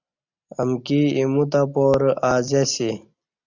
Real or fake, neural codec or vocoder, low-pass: real; none; 7.2 kHz